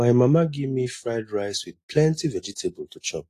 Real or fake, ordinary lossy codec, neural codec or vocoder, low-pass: real; AAC, 48 kbps; none; 14.4 kHz